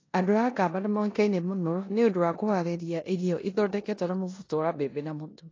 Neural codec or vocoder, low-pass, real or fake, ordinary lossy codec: codec, 16 kHz in and 24 kHz out, 0.9 kbps, LongCat-Audio-Codec, four codebook decoder; 7.2 kHz; fake; AAC, 32 kbps